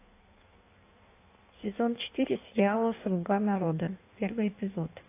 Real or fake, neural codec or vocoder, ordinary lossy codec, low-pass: fake; codec, 16 kHz in and 24 kHz out, 1.1 kbps, FireRedTTS-2 codec; AAC, 24 kbps; 3.6 kHz